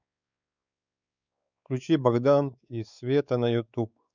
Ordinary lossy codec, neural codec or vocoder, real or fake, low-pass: none; codec, 16 kHz, 4 kbps, X-Codec, WavLM features, trained on Multilingual LibriSpeech; fake; 7.2 kHz